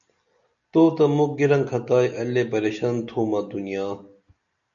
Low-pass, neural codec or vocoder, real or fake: 7.2 kHz; none; real